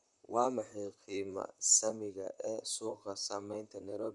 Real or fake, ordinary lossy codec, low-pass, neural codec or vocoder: fake; none; 10.8 kHz; vocoder, 44.1 kHz, 128 mel bands, Pupu-Vocoder